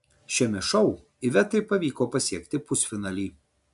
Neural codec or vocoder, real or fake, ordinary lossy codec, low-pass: none; real; AAC, 96 kbps; 10.8 kHz